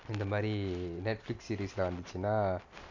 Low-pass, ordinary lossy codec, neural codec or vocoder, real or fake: 7.2 kHz; MP3, 64 kbps; none; real